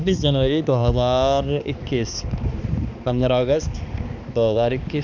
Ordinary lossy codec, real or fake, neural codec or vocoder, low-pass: none; fake; codec, 16 kHz, 2 kbps, X-Codec, HuBERT features, trained on balanced general audio; 7.2 kHz